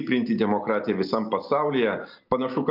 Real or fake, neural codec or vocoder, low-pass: real; none; 5.4 kHz